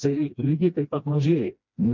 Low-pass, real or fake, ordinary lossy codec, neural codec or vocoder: 7.2 kHz; fake; MP3, 64 kbps; codec, 16 kHz, 1 kbps, FreqCodec, smaller model